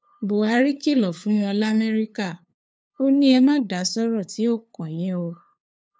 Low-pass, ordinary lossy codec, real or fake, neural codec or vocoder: none; none; fake; codec, 16 kHz, 2 kbps, FunCodec, trained on LibriTTS, 25 frames a second